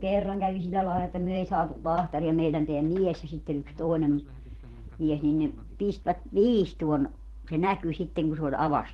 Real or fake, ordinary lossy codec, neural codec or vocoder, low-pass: fake; Opus, 16 kbps; vocoder, 44.1 kHz, 128 mel bands every 512 samples, BigVGAN v2; 19.8 kHz